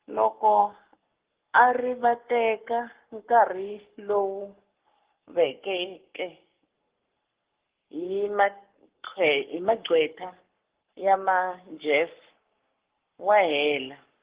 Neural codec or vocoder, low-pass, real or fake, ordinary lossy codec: codec, 44.1 kHz, 7.8 kbps, Pupu-Codec; 3.6 kHz; fake; Opus, 16 kbps